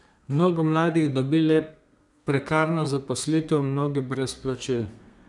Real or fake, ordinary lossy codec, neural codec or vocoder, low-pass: fake; none; codec, 32 kHz, 1.9 kbps, SNAC; 10.8 kHz